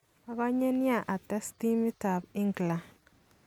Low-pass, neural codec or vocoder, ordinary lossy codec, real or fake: 19.8 kHz; none; none; real